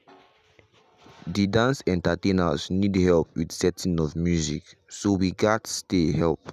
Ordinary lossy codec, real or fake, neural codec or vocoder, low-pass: none; real; none; 14.4 kHz